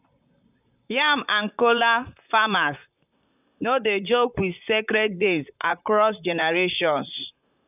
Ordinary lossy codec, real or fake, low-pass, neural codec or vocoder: none; fake; 3.6 kHz; vocoder, 44.1 kHz, 128 mel bands, Pupu-Vocoder